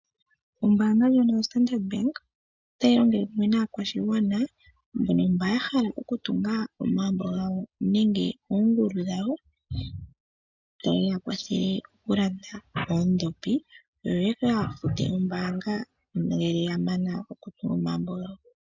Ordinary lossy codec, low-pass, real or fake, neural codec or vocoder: MP3, 64 kbps; 7.2 kHz; real; none